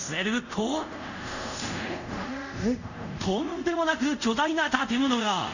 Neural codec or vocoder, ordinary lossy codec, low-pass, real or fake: codec, 24 kHz, 0.5 kbps, DualCodec; none; 7.2 kHz; fake